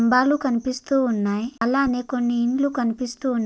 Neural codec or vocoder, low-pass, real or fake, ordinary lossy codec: none; none; real; none